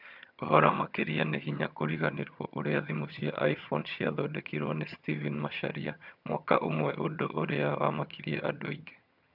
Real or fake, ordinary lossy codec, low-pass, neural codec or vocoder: fake; none; 5.4 kHz; vocoder, 22.05 kHz, 80 mel bands, HiFi-GAN